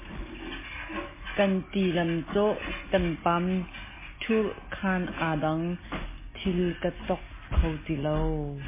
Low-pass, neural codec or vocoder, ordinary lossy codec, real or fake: 3.6 kHz; none; MP3, 16 kbps; real